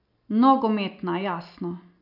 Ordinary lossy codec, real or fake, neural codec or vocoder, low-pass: none; real; none; 5.4 kHz